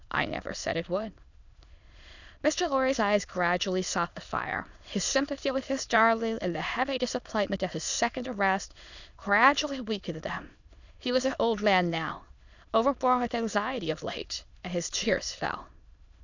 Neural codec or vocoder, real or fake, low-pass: autoencoder, 22.05 kHz, a latent of 192 numbers a frame, VITS, trained on many speakers; fake; 7.2 kHz